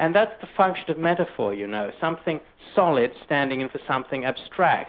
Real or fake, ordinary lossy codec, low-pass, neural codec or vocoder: real; Opus, 32 kbps; 5.4 kHz; none